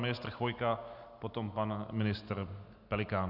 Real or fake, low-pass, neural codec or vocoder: real; 5.4 kHz; none